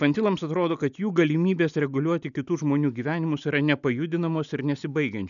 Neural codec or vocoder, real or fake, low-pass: none; real; 7.2 kHz